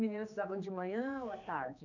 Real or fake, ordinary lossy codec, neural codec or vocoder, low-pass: fake; none; codec, 16 kHz, 2 kbps, X-Codec, HuBERT features, trained on general audio; 7.2 kHz